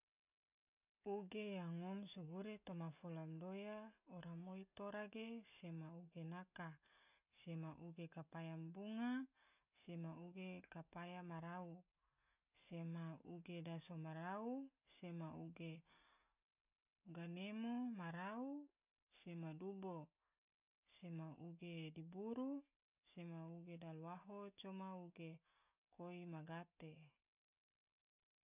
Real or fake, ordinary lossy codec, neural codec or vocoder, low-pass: real; none; none; 3.6 kHz